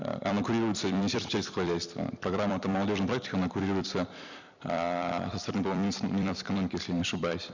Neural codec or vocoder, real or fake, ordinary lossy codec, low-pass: vocoder, 44.1 kHz, 128 mel bands every 256 samples, BigVGAN v2; fake; none; 7.2 kHz